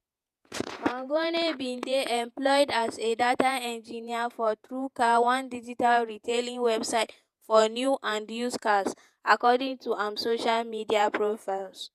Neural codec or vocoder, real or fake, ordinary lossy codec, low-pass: vocoder, 48 kHz, 128 mel bands, Vocos; fake; none; 14.4 kHz